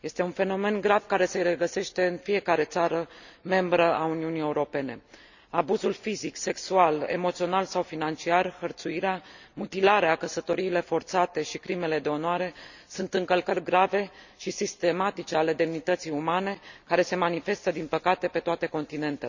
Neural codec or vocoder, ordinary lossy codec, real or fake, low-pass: none; none; real; 7.2 kHz